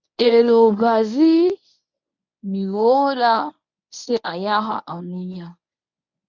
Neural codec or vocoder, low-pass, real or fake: codec, 24 kHz, 0.9 kbps, WavTokenizer, medium speech release version 1; 7.2 kHz; fake